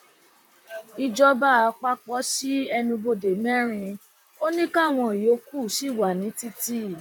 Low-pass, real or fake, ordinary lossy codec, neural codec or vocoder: 19.8 kHz; fake; none; vocoder, 44.1 kHz, 128 mel bands, Pupu-Vocoder